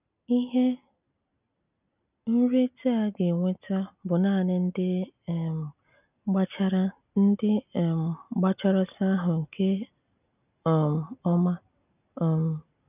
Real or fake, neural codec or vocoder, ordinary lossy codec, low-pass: real; none; none; 3.6 kHz